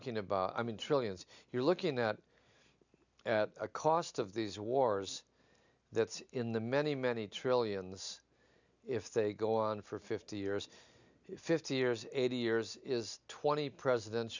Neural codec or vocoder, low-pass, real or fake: none; 7.2 kHz; real